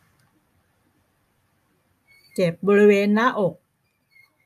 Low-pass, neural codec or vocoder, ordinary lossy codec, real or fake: 14.4 kHz; none; AAC, 96 kbps; real